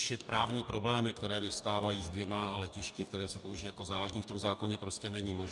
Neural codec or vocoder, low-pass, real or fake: codec, 44.1 kHz, 2.6 kbps, DAC; 10.8 kHz; fake